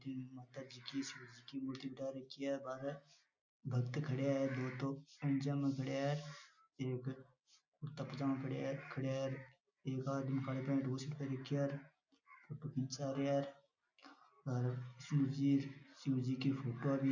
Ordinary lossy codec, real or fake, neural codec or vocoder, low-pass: none; real; none; 7.2 kHz